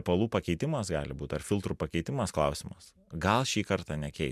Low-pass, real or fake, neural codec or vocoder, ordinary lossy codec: 14.4 kHz; real; none; MP3, 96 kbps